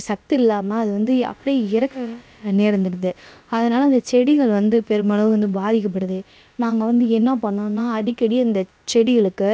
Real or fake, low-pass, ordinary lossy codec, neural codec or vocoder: fake; none; none; codec, 16 kHz, about 1 kbps, DyCAST, with the encoder's durations